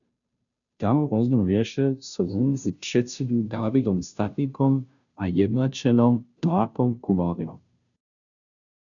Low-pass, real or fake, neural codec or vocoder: 7.2 kHz; fake; codec, 16 kHz, 0.5 kbps, FunCodec, trained on Chinese and English, 25 frames a second